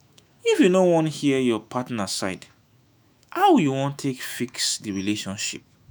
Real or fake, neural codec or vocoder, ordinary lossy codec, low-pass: fake; autoencoder, 48 kHz, 128 numbers a frame, DAC-VAE, trained on Japanese speech; none; none